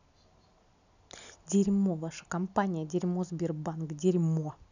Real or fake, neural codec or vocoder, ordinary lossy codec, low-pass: real; none; none; 7.2 kHz